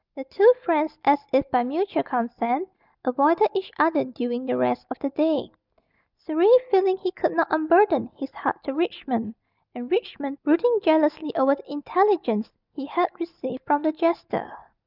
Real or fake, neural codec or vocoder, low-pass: real; none; 5.4 kHz